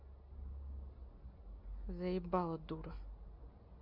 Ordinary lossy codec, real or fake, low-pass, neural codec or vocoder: none; real; 5.4 kHz; none